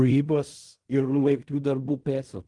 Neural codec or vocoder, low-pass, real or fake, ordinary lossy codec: codec, 16 kHz in and 24 kHz out, 0.4 kbps, LongCat-Audio-Codec, fine tuned four codebook decoder; 10.8 kHz; fake; Opus, 24 kbps